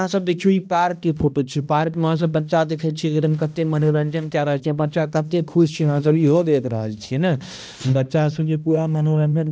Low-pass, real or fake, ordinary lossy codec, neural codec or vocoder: none; fake; none; codec, 16 kHz, 1 kbps, X-Codec, HuBERT features, trained on balanced general audio